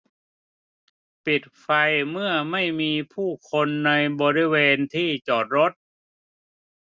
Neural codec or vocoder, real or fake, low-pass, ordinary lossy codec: none; real; none; none